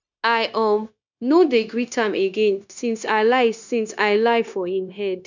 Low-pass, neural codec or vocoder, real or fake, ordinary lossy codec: 7.2 kHz; codec, 16 kHz, 0.9 kbps, LongCat-Audio-Codec; fake; none